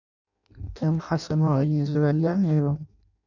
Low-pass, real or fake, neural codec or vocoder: 7.2 kHz; fake; codec, 16 kHz in and 24 kHz out, 0.6 kbps, FireRedTTS-2 codec